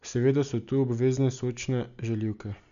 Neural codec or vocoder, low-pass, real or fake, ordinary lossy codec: none; 7.2 kHz; real; none